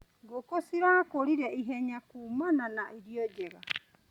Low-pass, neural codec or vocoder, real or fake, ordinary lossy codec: 19.8 kHz; none; real; Opus, 64 kbps